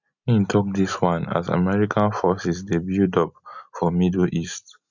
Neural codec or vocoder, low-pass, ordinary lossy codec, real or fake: none; 7.2 kHz; none; real